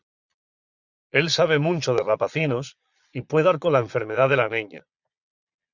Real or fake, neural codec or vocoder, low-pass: fake; vocoder, 22.05 kHz, 80 mel bands, Vocos; 7.2 kHz